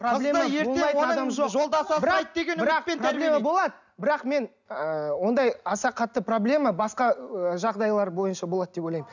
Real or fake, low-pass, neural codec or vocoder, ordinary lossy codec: real; 7.2 kHz; none; none